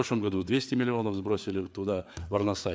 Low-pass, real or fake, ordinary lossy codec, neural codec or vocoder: none; fake; none; codec, 16 kHz, 4 kbps, FreqCodec, larger model